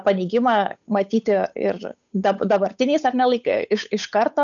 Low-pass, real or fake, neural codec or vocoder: 7.2 kHz; fake; codec, 16 kHz, 8 kbps, FunCodec, trained on Chinese and English, 25 frames a second